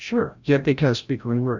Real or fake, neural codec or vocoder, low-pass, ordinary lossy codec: fake; codec, 16 kHz, 0.5 kbps, FreqCodec, larger model; 7.2 kHz; Opus, 64 kbps